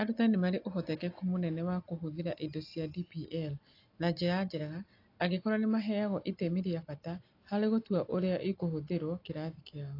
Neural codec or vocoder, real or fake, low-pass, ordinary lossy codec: none; real; 5.4 kHz; AAC, 32 kbps